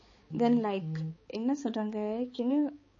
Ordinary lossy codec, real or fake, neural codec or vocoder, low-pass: MP3, 32 kbps; fake; codec, 16 kHz, 4 kbps, X-Codec, HuBERT features, trained on balanced general audio; 7.2 kHz